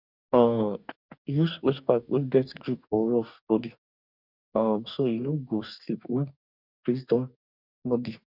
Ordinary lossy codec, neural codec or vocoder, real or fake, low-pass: none; codec, 44.1 kHz, 2.6 kbps, DAC; fake; 5.4 kHz